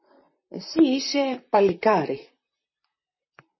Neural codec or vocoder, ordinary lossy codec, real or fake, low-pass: vocoder, 44.1 kHz, 128 mel bands, Pupu-Vocoder; MP3, 24 kbps; fake; 7.2 kHz